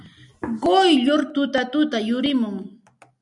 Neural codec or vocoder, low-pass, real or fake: none; 10.8 kHz; real